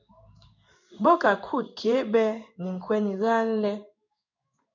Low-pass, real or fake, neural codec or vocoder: 7.2 kHz; fake; codec, 16 kHz in and 24 kHz out, 1 kbps, XY-Tokenizer